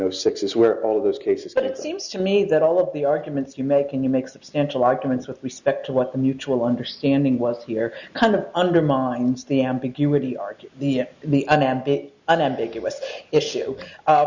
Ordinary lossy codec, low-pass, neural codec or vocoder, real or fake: Opus, 64 kbps; 7.2 kHz; none; real